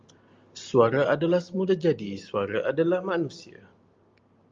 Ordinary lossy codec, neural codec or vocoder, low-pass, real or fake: Opus, 32 kbps; none; 7.2 kHz; real